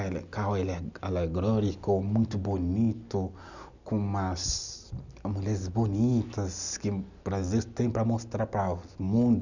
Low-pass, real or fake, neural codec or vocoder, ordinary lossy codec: 7.2 kHz; real; none; none